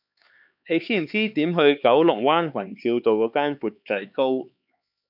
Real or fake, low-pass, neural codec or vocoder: fake; 5.4 kHz; codec, 16 kHz, 4 kbps, X-Codec, HuBERT features, trained on LibriSpeech